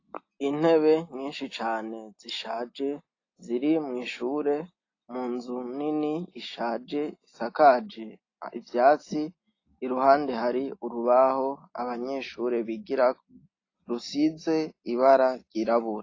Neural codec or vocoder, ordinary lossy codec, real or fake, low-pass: none; AAC, 32 kbps; real; 7.2 kHz